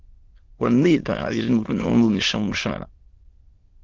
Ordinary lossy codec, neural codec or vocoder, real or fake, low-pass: Opus, 16 kbps; autoencoder, 22.05 kHz, a latent of 192 numbers a frame, VITS, trained on many speakers; fake; 7.2 kHz